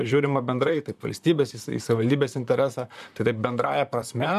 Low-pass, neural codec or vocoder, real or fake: 14.4 kHz; vocoder, 44.1 kHz, 128 mel bands, Pupu-Vocoder; fake